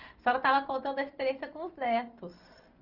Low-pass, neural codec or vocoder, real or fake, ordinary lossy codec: 5.4 kHz; none; real; Opus, 32 kbps